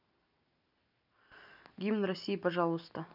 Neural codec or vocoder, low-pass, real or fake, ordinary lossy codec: none; 5.4 kHz; real; none